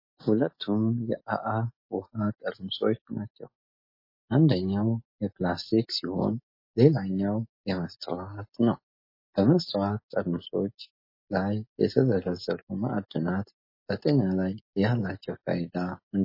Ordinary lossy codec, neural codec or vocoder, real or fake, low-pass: MP3, 24 kbps; none; real; 5.4 kHz